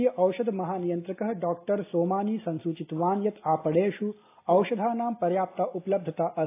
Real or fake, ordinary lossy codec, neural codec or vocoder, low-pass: real; AAC, 24 kbps; none; 3.6 kHz